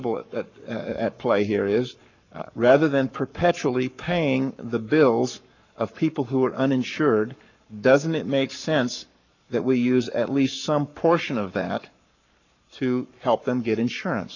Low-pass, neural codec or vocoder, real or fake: 7.2 kHz; codec, 44.1 kHz, 7.8 kbps, Pupu-Codec; fake